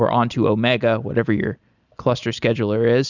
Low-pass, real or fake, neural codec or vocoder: 7.2 kHz; real; none